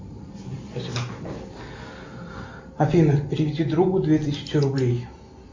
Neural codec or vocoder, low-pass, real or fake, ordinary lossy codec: none; 7.2 kHz; real; MP3, 64 kbps